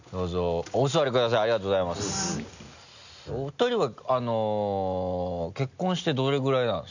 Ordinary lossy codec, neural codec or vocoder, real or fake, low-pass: none; none; real; 7.2 kHz